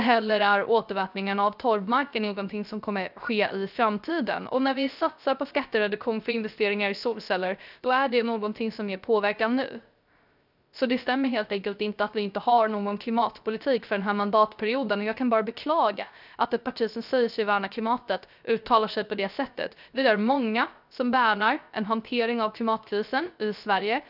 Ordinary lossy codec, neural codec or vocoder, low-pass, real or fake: none; codec, 16 kHz, 0.3 kbps, FocalCodec; 5.4 kHz; fake